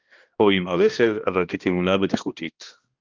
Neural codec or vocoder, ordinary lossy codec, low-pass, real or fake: codec, 16 kHz, 1 kbps, X-Codec, HuBERT features, trained on balanced general audio; Opus, 32 kbps; 7.2 kHz; fake